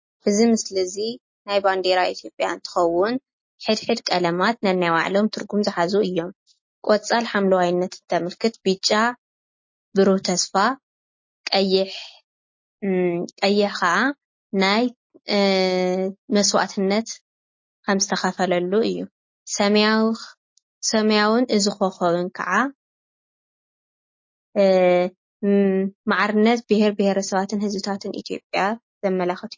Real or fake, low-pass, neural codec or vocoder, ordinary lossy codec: real; 7.2 kHz; none; MP3, 32 kbps